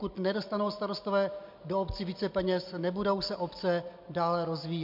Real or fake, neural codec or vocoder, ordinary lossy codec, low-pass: real; none; MP3, 48 kbps; 5.4 kHz